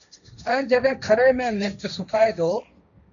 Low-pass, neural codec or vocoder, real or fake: 7.2 kHz; codec, 16 kHz, 1.1 kbps, Voila-Tokenizer; fake